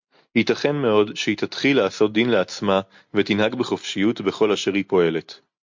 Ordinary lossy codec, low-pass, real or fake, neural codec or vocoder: MP3, 48 kbps; 7.2 kHz; real; none